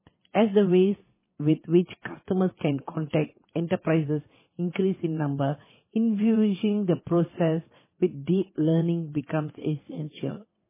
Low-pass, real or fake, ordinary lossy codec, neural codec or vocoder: 3.6 kHz; fake; MP3, 16 kbps; vocoder, 22.05 kHz, 80 mel bands, WaveNeXt